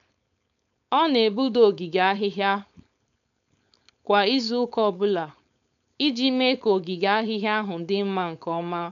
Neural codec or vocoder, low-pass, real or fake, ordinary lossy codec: codec, 16 kHz, 4.8 kbps, FACodec; 7.2 kHz; fake; none